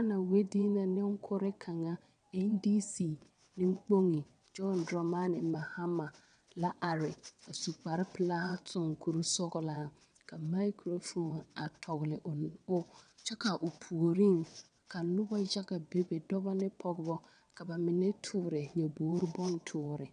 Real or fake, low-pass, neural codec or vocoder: fake; 9.9 kHz; vocoder, 22.05 kHz, 80 mel bands, WaveNeXt